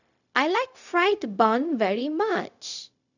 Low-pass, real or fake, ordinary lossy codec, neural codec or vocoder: 7.2 kHz; fake; none; codec, 16 kHz, 0.4 kbps, LongCat-Audio-Codec